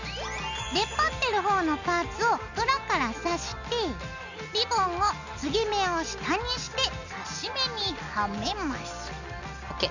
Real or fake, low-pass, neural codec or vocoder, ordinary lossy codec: real; 7.2 kHz; none; none